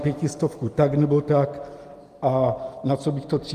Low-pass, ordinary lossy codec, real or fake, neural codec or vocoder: 14.4 kHz; Opus, 32 kbps; real; none